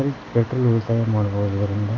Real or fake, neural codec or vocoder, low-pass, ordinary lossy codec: real; none; 7.2 kHz; AAC, 32 kbps